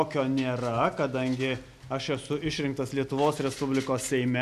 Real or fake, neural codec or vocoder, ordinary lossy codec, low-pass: real; none; AAC, 96 kbps; 14.4 kHz